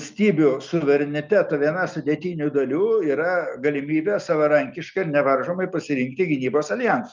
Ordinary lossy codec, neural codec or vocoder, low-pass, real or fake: Opus, 24 kbps; none; 7.2 kHz; real